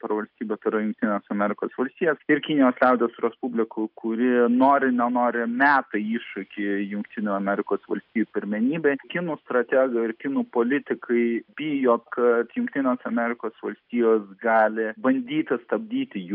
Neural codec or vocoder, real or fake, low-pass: none; real; 5.4 kHz